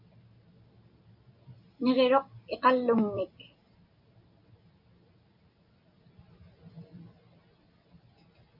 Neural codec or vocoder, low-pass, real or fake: none; 5.4 kHz; real